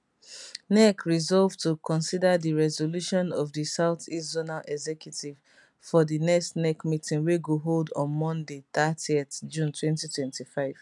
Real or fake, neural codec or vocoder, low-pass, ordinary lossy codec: real; none; 10.8 kHz; none